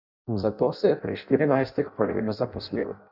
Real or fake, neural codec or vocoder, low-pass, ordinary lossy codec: fake; codec, 16 kHz in and 24 kHz out, 0.6 kbps, FireRedTTS-2 codec; 5.4 kHz; none